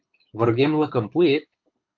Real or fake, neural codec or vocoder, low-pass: fake; codec, 24 kHz, 6 kbps, HILCodec; 7.2 kHz